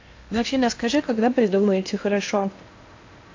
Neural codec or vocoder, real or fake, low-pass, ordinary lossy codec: codec, 16 kHz in and 24 kHz out, 0.8 kbps, FocalCodec, streaming, 65536 codes; fake; 7.2 kHz; AAC, 48 kbps